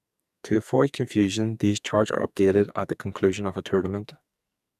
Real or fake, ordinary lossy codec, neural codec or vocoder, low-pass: fake; none; codec, 44.1 kHz, 2.6 kbps, SNAC; 14.4 kHz